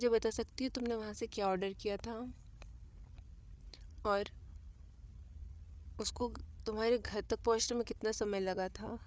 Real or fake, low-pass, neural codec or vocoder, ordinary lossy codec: fake; none; codec, 16 kHz, 8 kbps, FreqCodec, larger model; none